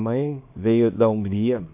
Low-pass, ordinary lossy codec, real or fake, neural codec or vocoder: 3.6 kHz; MP3, 32 kbps; fake; codec, 24 kHz, 0.9 kbps, WavTokenizer, small release